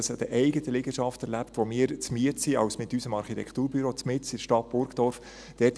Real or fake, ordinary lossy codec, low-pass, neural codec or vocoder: real; none; none; none